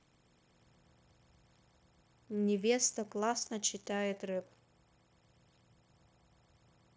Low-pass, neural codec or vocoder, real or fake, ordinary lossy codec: none; codec, 16 kHz, 0.9 kbps, LongCat-Audio-Codec; fake; none